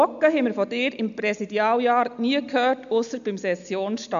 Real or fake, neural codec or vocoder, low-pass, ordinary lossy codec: real; none; 7.2 kHz; none